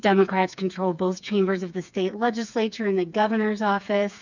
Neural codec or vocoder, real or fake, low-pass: codec, 16 kHz, 4 kbps, FreqCodec, smaller model; fake; 7.2 kHz